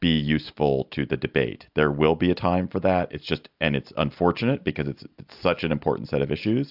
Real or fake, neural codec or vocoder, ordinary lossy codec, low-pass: real; none; AAC, 48 kbps; 5.4 kHz